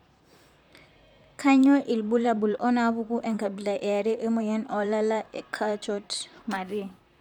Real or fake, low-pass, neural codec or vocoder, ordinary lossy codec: fake; 19.8 kHz; vocoder, 44.1 kHz, 128 mel bands, Pupu-Vocoder; none